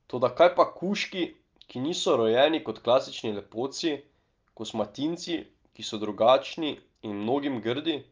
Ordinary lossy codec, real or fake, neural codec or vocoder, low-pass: Opus, 24 kbps; real; none; 7.2 kHz